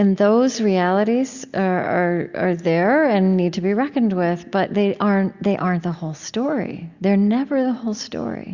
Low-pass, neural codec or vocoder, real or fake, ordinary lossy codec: 7.2 kHz; none; real; Opus, 64 kbps